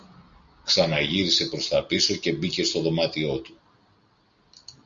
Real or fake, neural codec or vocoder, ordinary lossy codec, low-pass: real; none; Opus, 64 kbps; 7.2 kHz